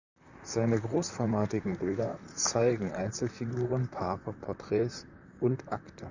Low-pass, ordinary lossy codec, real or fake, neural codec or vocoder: 7.2 kHz; Opus, 64 kbps; fake; vocoder, 44.1 kHz, 128 mel bands, Pupu-Vocoder